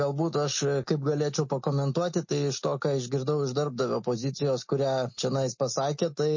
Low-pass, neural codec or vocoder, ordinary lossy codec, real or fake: 7.2 kHz; none; MP3, 32 kbps; real